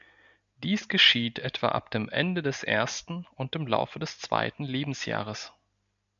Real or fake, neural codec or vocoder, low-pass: real; none; 7.2 kHz